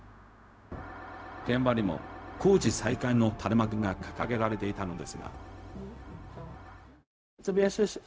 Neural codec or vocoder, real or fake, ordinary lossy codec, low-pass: codec, 16 kHz, 0.4 kbps, LongCat-Audio-Codec; fake; none; none